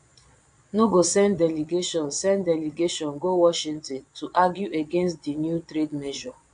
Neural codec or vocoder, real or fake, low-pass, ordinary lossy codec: vocoder, 22.05 kHz, 80 mel bands, Vocos; fake; 9.9 kHz; AAC, 64 kbps